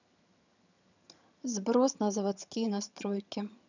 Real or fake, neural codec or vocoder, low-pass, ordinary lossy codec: fake; vocoder, 22.05 kHz, 80 mel bands, HiFi-GAN; 7.2 kHz; none